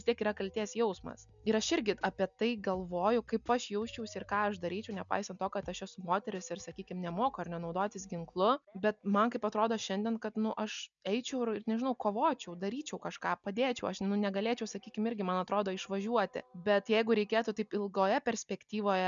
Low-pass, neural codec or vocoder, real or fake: 7.2 kHz; none; real